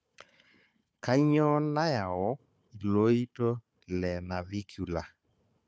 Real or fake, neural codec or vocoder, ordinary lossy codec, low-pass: fake; codec, 16 kHz, 4 kbps, FunCodec, trained on Chinese and English, 50 frames a second; none; none